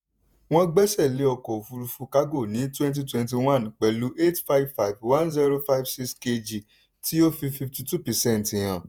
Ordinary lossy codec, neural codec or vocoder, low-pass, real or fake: none; vocoder, 48 kHz, 128 mel bands, Vocos; none; fake